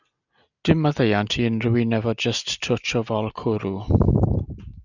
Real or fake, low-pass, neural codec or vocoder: real; 7.2 kHz; none